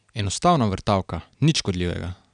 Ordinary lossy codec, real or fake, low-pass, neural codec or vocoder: none; real; 9.9 kHz; none